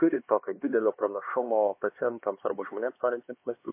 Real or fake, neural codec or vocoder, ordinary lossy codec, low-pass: fake; codec, 16 kHz, 2 kbps, X-Codec, HuBERT features, trained on LibriSpeech; MP3, 24 kbps; 3.6 kHz